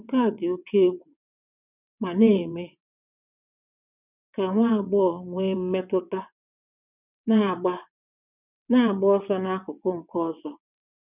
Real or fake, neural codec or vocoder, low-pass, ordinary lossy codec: fake; vocoder, 22.05 kHz, 80 mel bands, WaveNeXt; 3.6 kHz; none